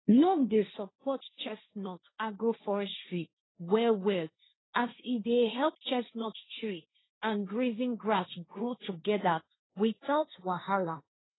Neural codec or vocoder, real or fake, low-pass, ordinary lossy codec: codec, 16 kHz, 1.1 kbps, Voila-Tokenizer; fake; 7.2 kHz; AAC, 16 kbps